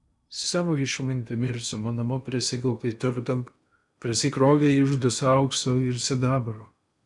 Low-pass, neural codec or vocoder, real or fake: 10.8 kHz; codec, 16 kHz in and 24 kHz out, 0.8 kbps, FocalCodec, streaming, 65536 codes; fake